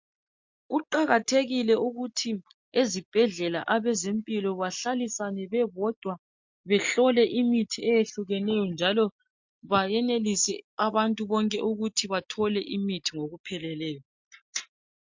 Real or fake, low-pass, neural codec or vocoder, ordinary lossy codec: real; 7.2 kHz; none; MP3, 48 kbps